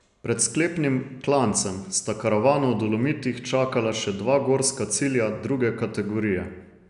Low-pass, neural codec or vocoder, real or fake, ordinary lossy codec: 10.8 kHz; none; real; none